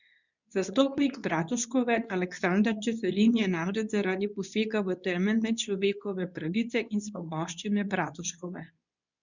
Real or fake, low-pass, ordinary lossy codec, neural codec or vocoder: fake; 7.2 kHz; none; codec, 24 kHz, 0.9 kbps, WavTokenizer, medium speech release version 2